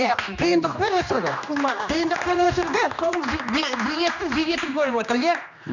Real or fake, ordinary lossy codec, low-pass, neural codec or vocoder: fake; none; 7.2 kHz; codec, 16 kHz, 2 kbps, X-Codec, HuBERT features, trained on general audio